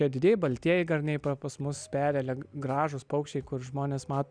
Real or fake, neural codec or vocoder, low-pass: real; none; 9.9 kHz